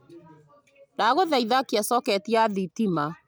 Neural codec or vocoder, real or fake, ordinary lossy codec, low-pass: none; real; none; none